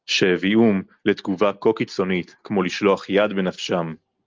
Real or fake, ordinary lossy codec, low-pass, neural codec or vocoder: real; Opus, 32 kbps; 7.2 kHz; none